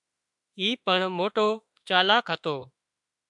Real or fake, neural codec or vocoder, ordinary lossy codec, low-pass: fake; autoencoder, 48 kHz, 32 numbers a frame, DAC-VAE, trained on Japanese speech; MP3, 96 kbps; 10.8 kHz